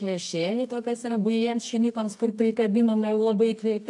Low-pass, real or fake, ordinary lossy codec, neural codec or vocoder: 10.8 kHz; fake; AAC, 64 kbps; codec, 24 kHz, 0.9 kbps, WavTokenizer, medium music audio release